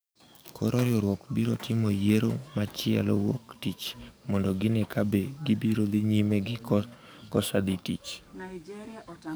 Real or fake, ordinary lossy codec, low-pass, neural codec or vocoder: fake; none; none; codec, 44.1 kHz, 7.8 kbps, DAC